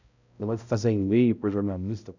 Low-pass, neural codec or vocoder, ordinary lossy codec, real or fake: 7.2 kHz; codec, 16 kHz, 0.5 kbps, X-Codec, HuBERT features, trained on balanced general audio; none; fake